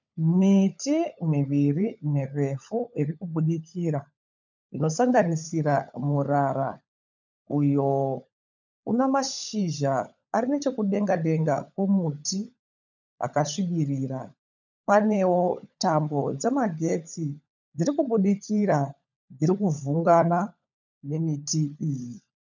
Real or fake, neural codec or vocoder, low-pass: fake; codec, 16 kHz, 16 kbps, FunCodec, trained on LibriTTS, 50 frames a second; 7.2 kHz